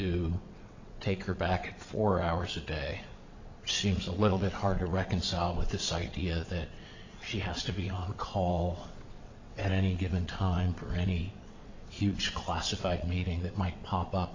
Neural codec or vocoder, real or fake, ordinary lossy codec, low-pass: vocoder, 22.05 kHz, 80 mel bands, WaveNeXt; fake; AAC, 48 kbps; 7.2 kHz